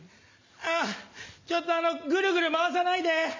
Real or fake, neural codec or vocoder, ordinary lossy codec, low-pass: real; none; MP3, 64 kbps; 7.2 kHz